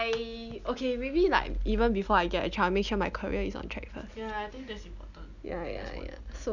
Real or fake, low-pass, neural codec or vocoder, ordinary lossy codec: real; 7.2 kHz; none; none